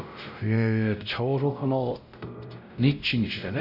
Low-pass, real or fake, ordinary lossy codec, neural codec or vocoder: 5.4 kHz; fake; none; codec, 16 kHz, 0.5 kbps, X-Codec, WavLM features, trained on Multilingual LibriSpeech